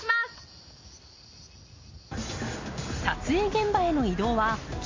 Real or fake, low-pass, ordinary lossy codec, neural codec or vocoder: real; 7.2 kHz; MP3, 32 kbps; none